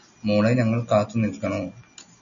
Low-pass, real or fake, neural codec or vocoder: 7.2 kHz; real; none